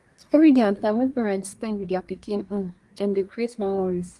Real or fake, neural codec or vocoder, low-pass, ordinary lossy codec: fake; codec, 24 kHz, 1 kbps, SNAC; 10.8 kHz; Opus, 24 kbps